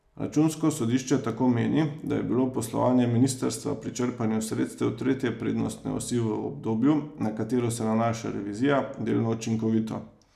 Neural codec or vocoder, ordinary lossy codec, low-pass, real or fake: none; none; 14.4 kHz; real